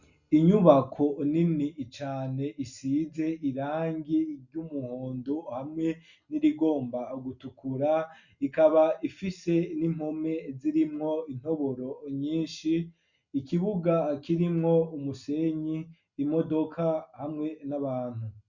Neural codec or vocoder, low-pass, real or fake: none; 7.2 kHz; real